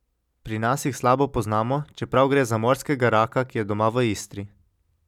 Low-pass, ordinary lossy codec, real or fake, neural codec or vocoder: 19.8 kHz; none; real; none